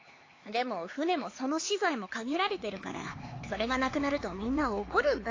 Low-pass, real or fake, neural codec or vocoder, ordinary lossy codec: 7.2 kHz; fake; codec, 16 kHz, 4 kbps, X-Codec, HuBERT features, trained on LibriSpeech; AAC, 32 kbps